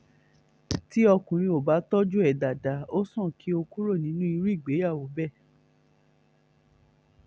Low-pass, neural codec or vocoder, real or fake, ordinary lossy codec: none; none; real; none